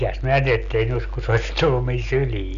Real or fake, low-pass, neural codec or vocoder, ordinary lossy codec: real; 7.2 kHz; none; MP3, 96 kbps